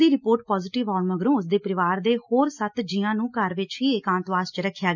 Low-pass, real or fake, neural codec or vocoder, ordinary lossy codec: 7.2 kHz; real; none; none